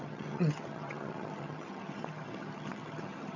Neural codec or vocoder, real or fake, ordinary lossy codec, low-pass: vocoder, 22.05 kHz, 80 mel bands, HiFi-GAN; fake; none; 7.2 kHz